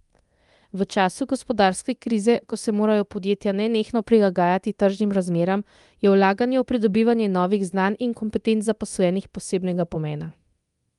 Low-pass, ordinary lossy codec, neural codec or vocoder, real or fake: 10.8 kHz; Opus, 32 kbps; codec, 24 kHz, 0.9 kbps, DualCodec; fake